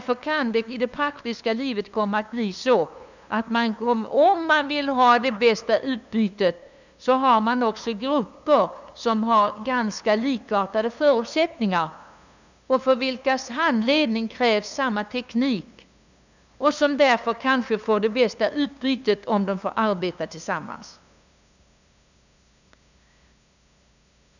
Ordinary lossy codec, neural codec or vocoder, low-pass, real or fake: none; codec, 16 kHz, 2 kbps, FunCodec, trained on LibriTTS, 25 frames a second; 7.2 kHz; fake